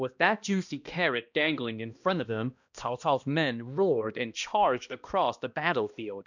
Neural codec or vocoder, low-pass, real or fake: codec, 16 kHz, 1 kbps, X-Codec, HuBERT features, trained on balanced general audio; 7.2 kHz; fake